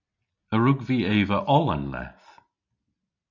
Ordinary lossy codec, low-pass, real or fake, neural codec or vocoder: MP3, 64 kbps; 7.2 kHz; real; none